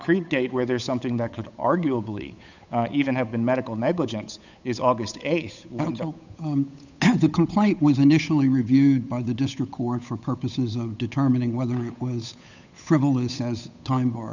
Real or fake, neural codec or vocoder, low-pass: fake; codec, 16 kHz, 8 kbps, FunCodec, trained on Chinese and English, 25 frames a second; 7.2 kHz